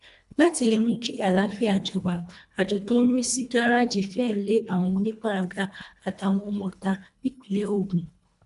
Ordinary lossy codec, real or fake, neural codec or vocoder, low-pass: none; fake; codec, 24 kHz, 1.5 kbps, HILCodec; 10.8 kHz